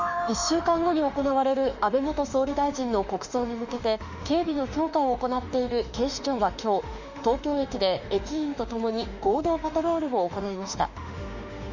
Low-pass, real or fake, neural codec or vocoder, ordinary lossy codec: 7.2 kHz; fake; autoencoder, 48 kHz, 32 numbers a frame, DAC-VAE, trained on Japanese speech; none